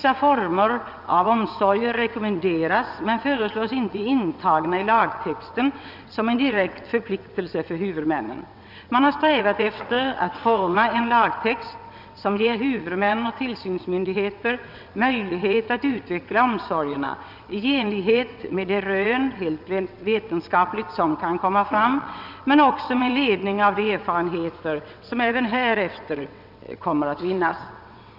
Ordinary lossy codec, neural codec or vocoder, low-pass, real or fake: none; vocoder, 22.05 kHz, 80 mel bands, WaveNeXt; 5.4 kHz; fake